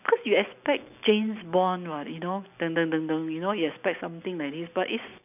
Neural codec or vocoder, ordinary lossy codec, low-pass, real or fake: none; none; 3.6 kHz; real